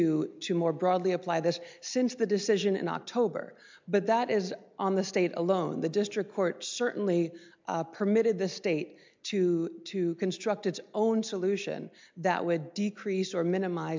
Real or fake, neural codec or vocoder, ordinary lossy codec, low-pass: real; none; MP3, 64 kbps; 7.2 kHz